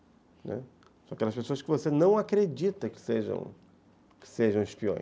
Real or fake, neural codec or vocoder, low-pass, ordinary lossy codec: real; none; none; none